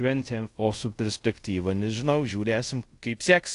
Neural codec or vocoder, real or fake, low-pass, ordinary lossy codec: codec, 24 kHz, 0.5 kbps, DualCodec; fake; 10.8 kHz; AAC, 48 kbps